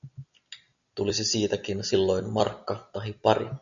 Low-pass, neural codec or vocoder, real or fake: 7.2 kHz; none; real